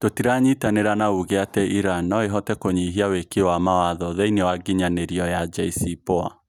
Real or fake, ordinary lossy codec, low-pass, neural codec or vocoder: real; none; 19.8 kHz; none